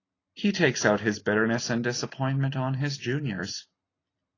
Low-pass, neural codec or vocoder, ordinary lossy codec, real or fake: 7.2 kHz; none; AAC, 32 kbps; real